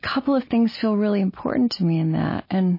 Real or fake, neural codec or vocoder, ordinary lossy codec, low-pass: real; none; MP3, 24 kbps; 5.4 kHz